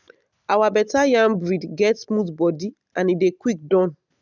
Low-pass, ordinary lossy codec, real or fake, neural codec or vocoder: 7.2 kHz; none; real; none